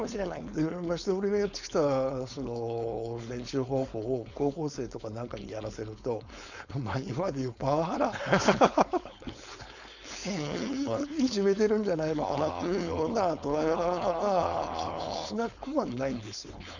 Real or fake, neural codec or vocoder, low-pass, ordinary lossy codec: fake; codec, 16 kHz, 4.8 kbps, FACodec; 7.2 kHz; none